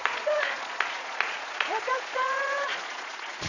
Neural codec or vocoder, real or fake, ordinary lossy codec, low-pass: vocoder, 22.05 kHz, 80 mel bands, Vocos; fake; none; 7.2 kHz